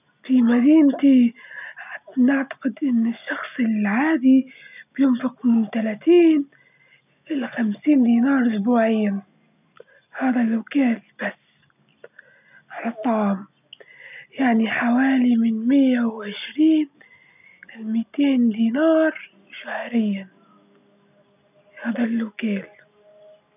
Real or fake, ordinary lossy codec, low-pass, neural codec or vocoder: real; none; 3.6 kHz; none